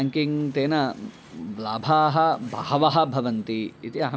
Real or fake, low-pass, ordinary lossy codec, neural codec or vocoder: real; none; none; none